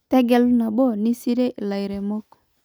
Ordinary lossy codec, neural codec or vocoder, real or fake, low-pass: none; none; real; none